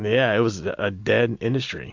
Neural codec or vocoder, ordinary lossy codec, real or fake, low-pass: none; AAC, 48 kbps; real; 7.2 kHz